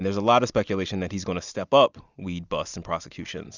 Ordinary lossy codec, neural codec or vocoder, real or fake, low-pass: Opus, 64 kbps; none; real; 7.2 kHz